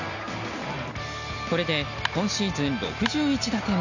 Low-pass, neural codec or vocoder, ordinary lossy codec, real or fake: 7.2 kHz; none; none; real